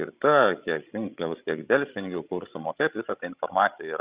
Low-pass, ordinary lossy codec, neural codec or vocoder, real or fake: 3.6 kHz; Opus, 64 kbps; codec, 16 kHz, 16 kbps, FreqCodec, larger model; fake